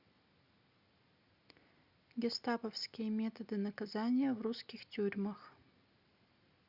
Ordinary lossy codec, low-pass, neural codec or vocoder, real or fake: Opus, 64 kbps; 5.4 kHz; none; real